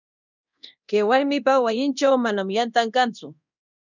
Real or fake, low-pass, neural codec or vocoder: fake; 7.2 kHz; codec, 24 kHz, 0.9 kbps, DualCodec